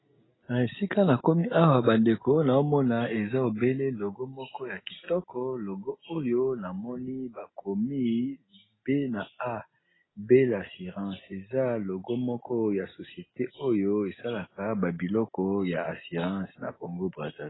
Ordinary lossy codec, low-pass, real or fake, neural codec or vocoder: AAC, 16 kbps; 7.2 kHz; real; none